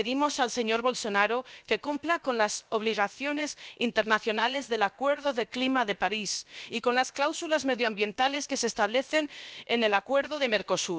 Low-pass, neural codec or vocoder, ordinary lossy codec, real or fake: none; codec, 16 kHz, about 1 kbps, DyCAST, with the encoder's durations; none; fake